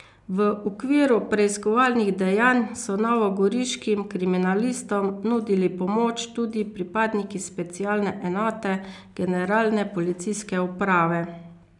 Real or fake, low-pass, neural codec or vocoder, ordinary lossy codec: real; 10.8 kHz; none; none